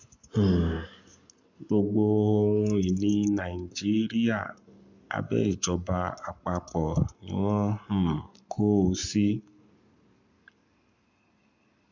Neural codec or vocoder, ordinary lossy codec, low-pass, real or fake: codec, 44.1 kHz, 7.8 kbps, Pupu-Codec; MP3, 64 kbps; 7.2 kHz; fake